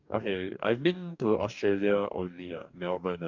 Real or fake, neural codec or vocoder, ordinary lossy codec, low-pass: fake; codec, 44.1 kHz, 2.6 kbps, DAC; none; 7.2 kHz